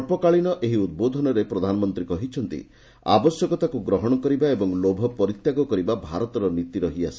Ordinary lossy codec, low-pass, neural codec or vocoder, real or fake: none; none; none; real